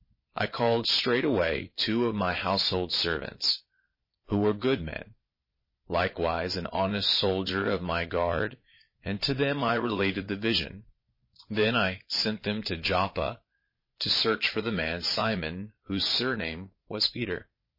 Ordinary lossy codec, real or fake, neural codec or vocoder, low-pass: MP3, 24 kbps; fake; vocoder, 22.05 kHz, 80 mel bands, Vocos; 5.4 kHz